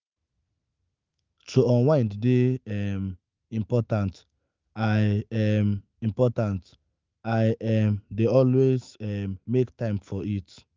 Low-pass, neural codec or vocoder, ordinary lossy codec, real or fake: 7.2 kHz; autoencoder, 48 kHz, 128 numbers a frame, DAC-VAE, trained on Japanese speech; Opus, 24 kbps; fake